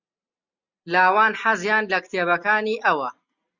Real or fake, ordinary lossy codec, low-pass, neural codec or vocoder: real; Opus, 64 kbps; 7.2 kHz; none